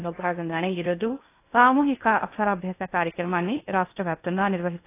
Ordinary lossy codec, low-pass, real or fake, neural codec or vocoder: AAC, 24 kbps; 3.6 kHz; fake; codec, 16 kHz in and 24 kHz out, 0.6 kbps, FocalCodec, streaming, 2048 codes